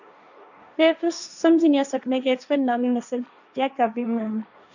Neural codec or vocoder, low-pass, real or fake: codec, 16 kHz, 1.1 kbps, Voila-Tokenizer; 7.2 kHz; fake